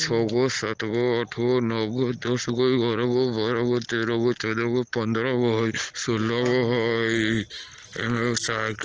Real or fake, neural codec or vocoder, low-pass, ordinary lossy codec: real; none; 7.2 kHz; Opus, 16 kbps